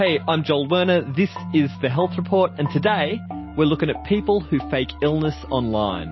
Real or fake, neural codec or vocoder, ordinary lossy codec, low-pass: real; none; MP3, 24 kbps; 7.2 kHz